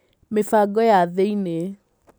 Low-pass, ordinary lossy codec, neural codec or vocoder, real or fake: none; none; none; real